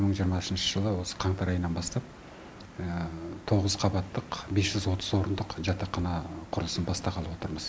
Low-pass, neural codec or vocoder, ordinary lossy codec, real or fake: none; none; none; real